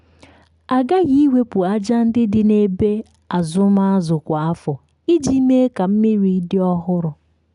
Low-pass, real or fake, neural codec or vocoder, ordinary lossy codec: 10.8 kHz; real; none; none